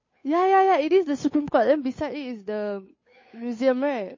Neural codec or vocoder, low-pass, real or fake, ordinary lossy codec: codec, 16 kHz, 8 kbps, FunCodec, trained on Chinese and English, 25 frames a second; 7.2 kHz; fake; MP3, 32 kbps